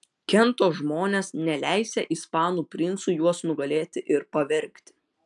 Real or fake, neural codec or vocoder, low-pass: real; none; 10.8 kHz